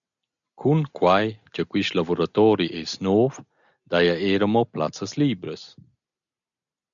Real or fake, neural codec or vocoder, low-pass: real; none; 7.2 kHz